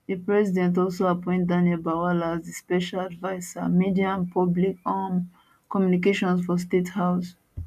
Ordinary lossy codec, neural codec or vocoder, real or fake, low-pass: none; none; real; 14.4 kHz